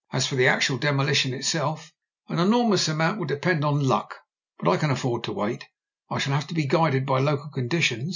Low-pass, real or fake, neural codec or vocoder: 7.2 kHz; real; none